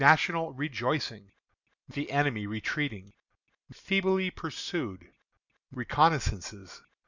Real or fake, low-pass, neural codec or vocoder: real; 7.2 kHz; none